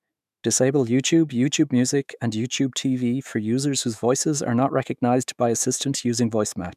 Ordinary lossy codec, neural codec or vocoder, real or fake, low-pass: none; autoencoder, 48 kHz, 128 numbers a frame, DAC-VAE, trained on Japanese speech; fake; 14.4 kHz